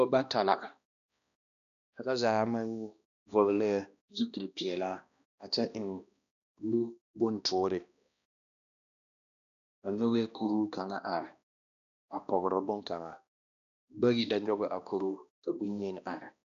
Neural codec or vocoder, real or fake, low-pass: codec, 16 kHz, 1 kbps, X-Codec, HuBERT features, trained on balanced general audio; fake; 7.2 kHz